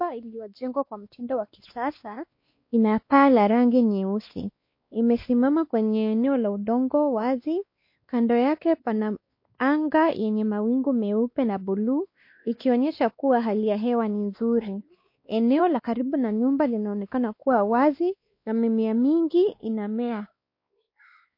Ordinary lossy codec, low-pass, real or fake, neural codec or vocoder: MP3, 32 kbps; 5.4 kHz; fake; codec, 16 kHz, 2 kbps, X-Codec, WavLM features, trained on Multilingual LibriSpeech